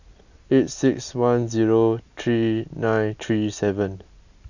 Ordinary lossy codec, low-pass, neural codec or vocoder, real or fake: none; 7.2 kHz; none; real